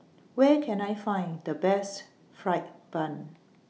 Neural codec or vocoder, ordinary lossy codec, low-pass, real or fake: none; none; none; real